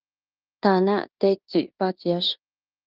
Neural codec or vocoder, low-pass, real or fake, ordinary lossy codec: codec, 16 kHz in and 24 kHz out, 0.9 kbps, LongCat-Audio-Codec, fine tuned four codebook decoder; 5.4 kHz; fake; Opus, 24 kbps